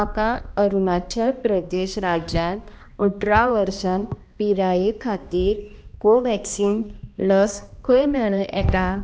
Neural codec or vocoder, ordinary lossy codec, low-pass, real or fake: codec, 16 kHz, 1 kbps, X-Codec, HuBERT features, trained on balanced general audio; none; none; fake